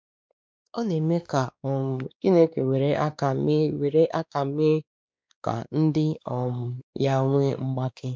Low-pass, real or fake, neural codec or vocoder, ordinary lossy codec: none; fake; codec, 16 kHz, 2 kbps, X-Codec, WavLM features, trained on Multilingual LibriSpeech; none